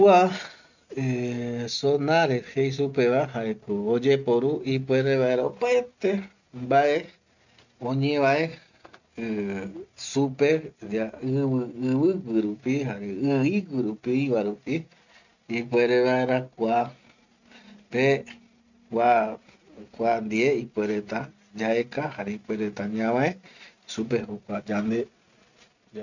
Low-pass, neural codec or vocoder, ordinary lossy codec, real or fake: 7.2 kHz; none; none; real